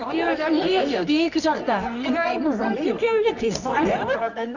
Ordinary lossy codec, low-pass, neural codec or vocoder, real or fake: none; 7.2 kHz; codec, 24 kHz, 0.9 kbps, WavTokenizer, medium music audio release; fake